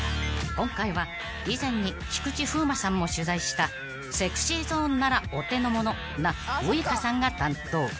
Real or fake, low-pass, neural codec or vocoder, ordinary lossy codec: real; none; none; none